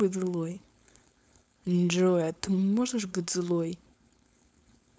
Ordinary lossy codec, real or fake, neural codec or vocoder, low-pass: none; fake; codec, 16 kHz, 4.8 kbps, FACodec; none